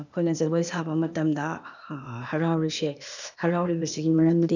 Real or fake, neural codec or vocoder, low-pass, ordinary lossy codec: fake; codec, 16 kHz, 0.8 kbps, ZipCodec; 7.2 kHz; none